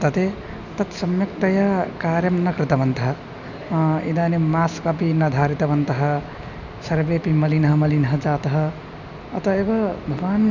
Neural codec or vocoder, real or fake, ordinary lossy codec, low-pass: none; real; none; 7.2 kHz